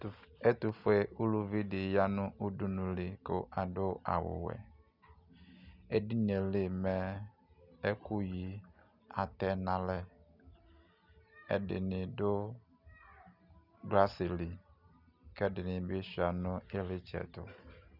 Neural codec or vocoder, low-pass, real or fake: none; 5.4 kHz; real